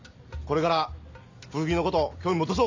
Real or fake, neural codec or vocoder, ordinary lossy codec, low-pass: real; none; MP3, 64 kbps; 7.2 kHz